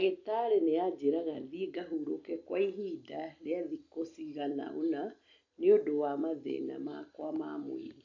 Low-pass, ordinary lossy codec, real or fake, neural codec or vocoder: 7.2 kHz; MP3, 48 kbps; real; none